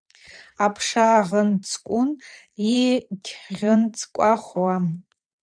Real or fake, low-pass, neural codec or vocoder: fake; 9.9 kHz; vocoder, 22.05 kHz, 80 mel bands, Vocos